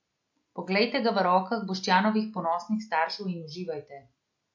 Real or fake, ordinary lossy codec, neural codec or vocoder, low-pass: real; MP3, 48 kbps; none; 7.2 kHz